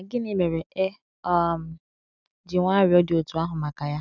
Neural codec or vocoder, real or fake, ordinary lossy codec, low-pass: none; real; none; 7.2 kHz